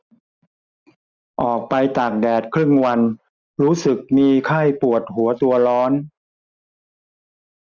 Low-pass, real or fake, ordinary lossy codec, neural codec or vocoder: 7.2 kHz; real; none; none